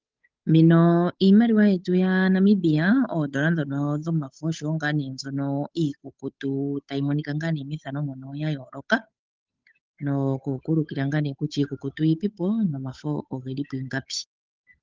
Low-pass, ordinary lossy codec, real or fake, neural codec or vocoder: 7.2 kHz; Opus, 32 kbps; fake; codec, 16 kHz, 8 kbps, FunCodec, trained on Chinese and English, 25 frames a second